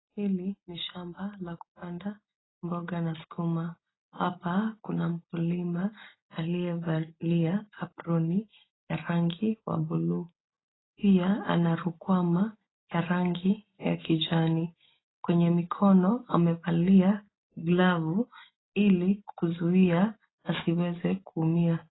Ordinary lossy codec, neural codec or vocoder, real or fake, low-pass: AAC, 16 kbps; none; real; 7.2 kHz